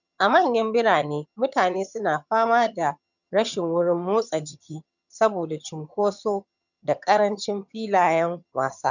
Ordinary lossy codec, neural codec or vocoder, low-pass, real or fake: none; vocoder, 22.05 kHz, 80 mel bands, HiFi-GAN; 7.2 kHz; fake